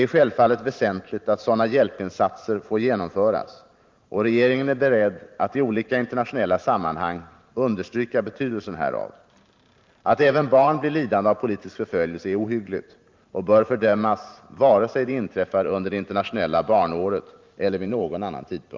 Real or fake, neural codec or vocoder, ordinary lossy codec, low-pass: fake; vocoder, 44.1 kHz, 128 mel bands every 512 samples, BigVGAN v2; Opus, 24 kbps; 7.2 kHz